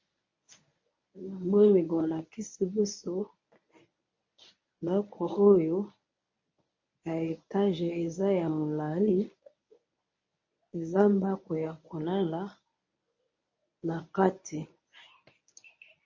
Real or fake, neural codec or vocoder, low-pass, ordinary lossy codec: fake; codec, 24 kHz, 0.9 kbps, WavTokenizer, medium speech release version 1; 7.2 kHz; MP3, 32 kbps